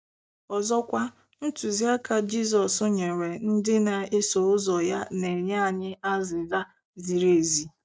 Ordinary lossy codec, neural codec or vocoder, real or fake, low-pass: none; none; real; none